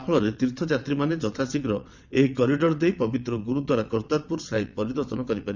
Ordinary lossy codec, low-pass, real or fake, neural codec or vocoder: none; 7.2 kHz; fake; vocoder, 22.05 kHz, 80 mel bands, WaveNeXt